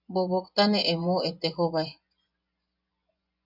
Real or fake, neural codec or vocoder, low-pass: fake; vocoder, 24 kHz, 100 mel bands, Vocos; 5.4 kHz